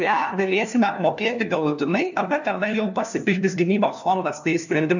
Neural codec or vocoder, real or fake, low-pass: codec, 16 kHz, 1 kbps, FunCodec, trained on LibriTTS, 50 frames a second; fake; 7.2 kHz